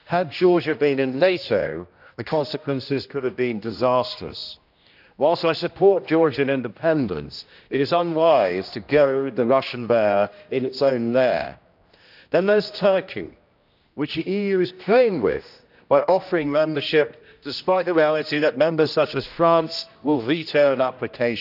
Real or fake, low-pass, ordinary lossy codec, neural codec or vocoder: fake; 5.4 kHz; none; codec, 16 kHz, 1 kbps, X-Codec, HuBERT features, trained on general audio